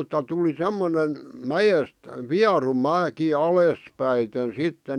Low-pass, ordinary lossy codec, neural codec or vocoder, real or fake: 19.8 kHz; none; codec, 44.1 kHz, 7.8 kbps, DAC; fake